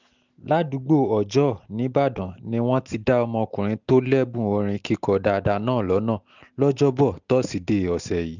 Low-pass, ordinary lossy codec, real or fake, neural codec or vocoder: 7.2 kHz; none; real; none